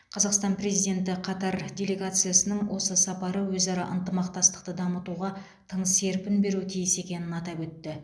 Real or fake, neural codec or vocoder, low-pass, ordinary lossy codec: real; none; none; none